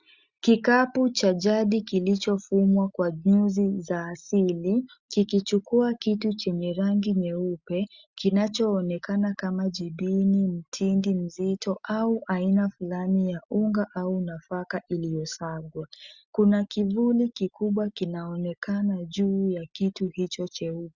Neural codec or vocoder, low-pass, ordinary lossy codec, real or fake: none; 7.2 kHz; Opus, 64 kbps; real